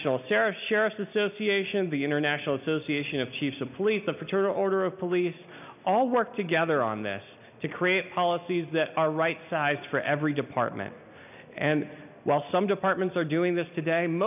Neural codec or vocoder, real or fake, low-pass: none; real; 3.6 kHz